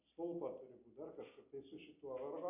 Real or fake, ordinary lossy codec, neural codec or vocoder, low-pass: real; MP3, 32 kbps; none; 3.6 kHz